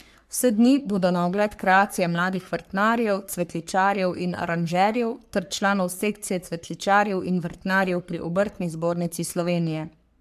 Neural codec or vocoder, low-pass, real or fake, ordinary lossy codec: codec, 44.1 kHz, 3.4 kbps, Pupu-Codec; 14.4 kHz; fake; AAC, 96 kbps